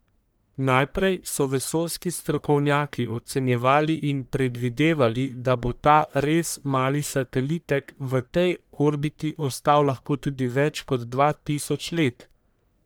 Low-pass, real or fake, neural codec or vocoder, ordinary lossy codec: none; fake; codec, 44.1 kHz, 1.7 kbps, Pupu-Codec; none